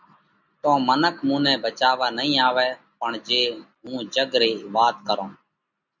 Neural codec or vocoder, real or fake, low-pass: none; real; 7.2 kHz